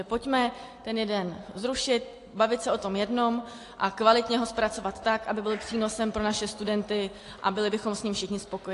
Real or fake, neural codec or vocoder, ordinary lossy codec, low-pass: real; none; AAC, 48 kbps; 10.8 kHz